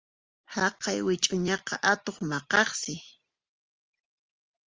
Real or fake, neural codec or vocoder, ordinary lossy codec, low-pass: real; none; Opus, 32 kbps; 7.2 kHz